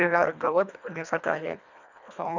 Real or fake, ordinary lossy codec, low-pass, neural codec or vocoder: fake; none; 7.2 kHz; codec, 24 kHz, 1.5 kbps, HILCodec